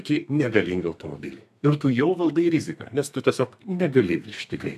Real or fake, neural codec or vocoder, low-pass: fake; codec, 32 kHz, 1.9 kbps, SNAC; 14.4 kHz